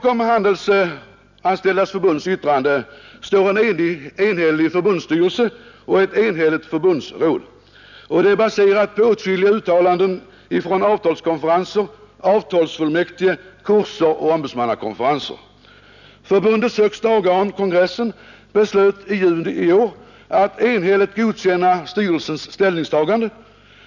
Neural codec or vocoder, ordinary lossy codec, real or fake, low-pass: none; none; real; 7.2 kHz